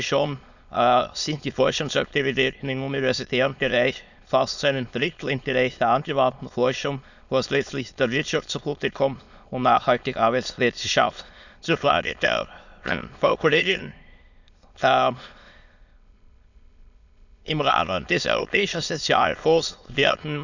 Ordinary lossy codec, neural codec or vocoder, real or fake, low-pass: none; autoencoder, 22.05 kHz, a latent of 192 numbers a frame, VITS, trained on many speakers; fake; 7.2 kHz